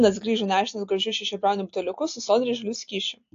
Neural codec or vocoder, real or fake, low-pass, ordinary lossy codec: none; real; 7.2 kHz; AAC, 48 kbps